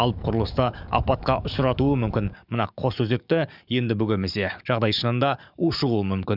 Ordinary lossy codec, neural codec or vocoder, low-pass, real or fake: none; codec, 44.1 kHz, 7.8 kbps, DAC; 5.4 kHz; fake